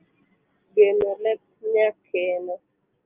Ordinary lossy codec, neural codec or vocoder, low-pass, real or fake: Opus, 24 kbps; none; 3.6 kHz; real